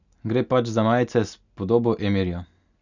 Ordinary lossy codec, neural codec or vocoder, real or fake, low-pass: none; none; real; 7.2 kHz